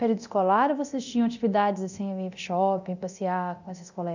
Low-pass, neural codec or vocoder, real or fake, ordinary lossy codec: 7.2 kHz; codec, 24 kHz, 0.9 kbps, DualCodec; fake; none